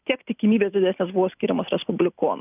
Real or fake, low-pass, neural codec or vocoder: real; 3.6 kHz; none